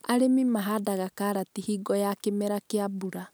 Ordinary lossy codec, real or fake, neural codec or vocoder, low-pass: none; real; none; none